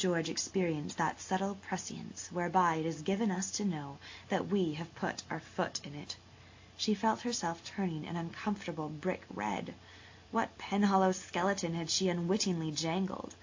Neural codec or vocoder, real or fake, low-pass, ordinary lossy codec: none; real; 7.2 kHz; AAC, 48 kbps